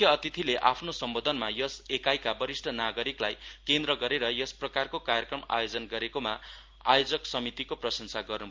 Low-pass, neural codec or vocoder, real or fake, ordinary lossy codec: 7.2 kHz; none; real; Opus, 32 kbps